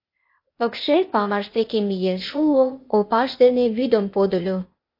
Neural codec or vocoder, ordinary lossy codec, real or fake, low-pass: codec, 16 kHz, 0.8 kbps, ZipCodec; MP3, 32 kbps; fake; 5.4 kHz